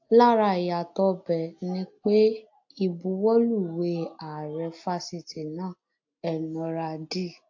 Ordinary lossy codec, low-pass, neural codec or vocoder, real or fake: AAC, 48 kbps; 7.2 kHz; none; real